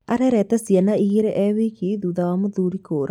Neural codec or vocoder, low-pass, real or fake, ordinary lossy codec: none; 19.8 kHz; real; none